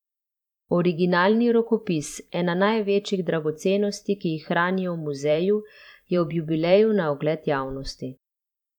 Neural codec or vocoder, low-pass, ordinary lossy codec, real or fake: vocoder, 44.1 kHz, 128 mel bands every 512 samples, BigVGAN v2; 19.8 kHz; none; fake